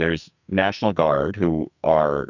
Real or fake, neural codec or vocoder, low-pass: fake; codec, 44.1 kHz, 2.6 kbps, SNAC; 7.2 kHz